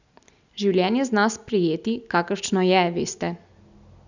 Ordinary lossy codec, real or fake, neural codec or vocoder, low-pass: none; real; none; 7.2 kHz